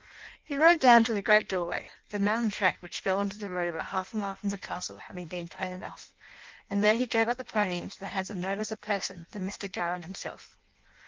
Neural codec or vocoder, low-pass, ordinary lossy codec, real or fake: codec, 16 kHz in and 24 kHz out, 0.6 kbps, FireRedTTS-2 codec; 7.2 kHz; Opus, 32 kbps; fake